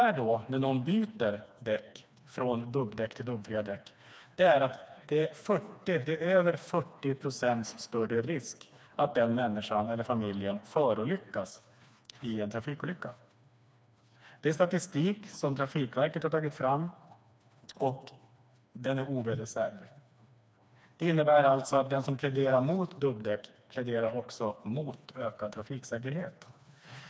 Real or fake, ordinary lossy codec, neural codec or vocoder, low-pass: fake; none; codec, 16 kHz, 2 kbps, FreqCodec, smaller model; none